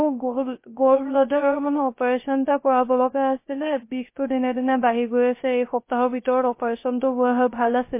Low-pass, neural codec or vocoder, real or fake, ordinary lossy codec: 3.6 kHz; codec, 16 kHz, 0.3 kbps, FocalCodec; fake; MP3, 24 kbps